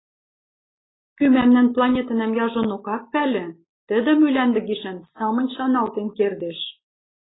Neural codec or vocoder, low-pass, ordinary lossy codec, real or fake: none; 7.2 kHz; AAC, 16 kbps; real